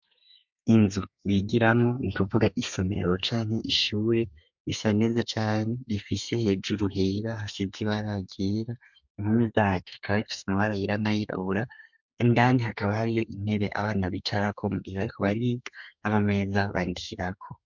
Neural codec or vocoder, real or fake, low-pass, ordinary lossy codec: codec, 32 kHz, 1.9 kbps, SNAC; fake; 7.2 kHz; MP3, 64 kbps